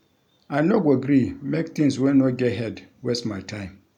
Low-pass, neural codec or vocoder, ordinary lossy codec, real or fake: 19.8 kHz; none; none; real